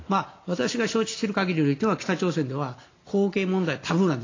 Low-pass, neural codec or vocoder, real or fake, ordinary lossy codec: 7.2 kHz; none; real; AAC, 32 kbps